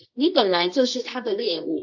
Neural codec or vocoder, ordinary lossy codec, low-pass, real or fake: codec, 24 kHz, 0.9 kbps, WavTokenizer, medium music audio release; AAC, 48 kbps; 7.2 kHz; fake